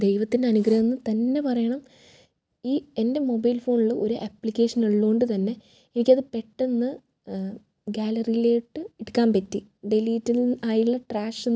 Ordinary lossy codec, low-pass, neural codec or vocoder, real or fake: none; none; none; real